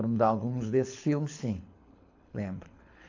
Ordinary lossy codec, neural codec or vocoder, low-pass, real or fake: AAC, 48 kbps; codec, 24 kHz, 6 kbps, HILCodec; 7.2 kHz; fake